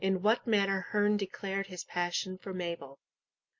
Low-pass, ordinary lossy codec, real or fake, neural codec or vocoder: 7.2 kHz; MP3, 48 kbps; real; none